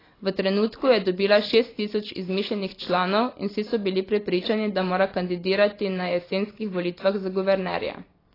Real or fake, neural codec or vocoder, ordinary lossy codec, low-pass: fake; vocoder, 44.1 kHz, 128 mel bands every 256 samples, BigVGAN v2; AAC, 24 kbps; 5.4 kHz